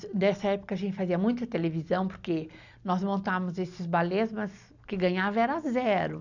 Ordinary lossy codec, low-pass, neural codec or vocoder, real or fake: none; 7.2 kHz; none; real